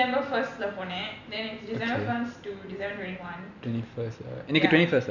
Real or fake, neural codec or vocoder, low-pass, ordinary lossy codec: real; none; 7.2 kHz; none